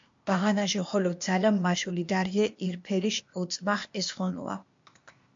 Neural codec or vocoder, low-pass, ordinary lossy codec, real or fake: codec, 16 kHz, 0.8 kbps, ZipCodec; 7.2 kHz; MP3, 48 kbps; fake